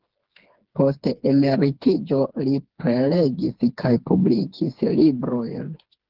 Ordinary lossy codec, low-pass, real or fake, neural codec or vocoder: Opus, 16 kbps; 5.4 kHz; fake; codec, 16 kHz, 4 kbps, FreqCodec, smaller model